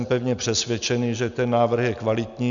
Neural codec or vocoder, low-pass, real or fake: none; 7.2 kHz; real